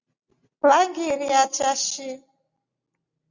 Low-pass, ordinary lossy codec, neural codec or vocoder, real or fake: 7.2 kHz; Opus, 64 kbps; none; real